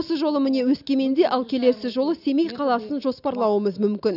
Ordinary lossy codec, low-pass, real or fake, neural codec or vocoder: none; 5.4 kHz; real; none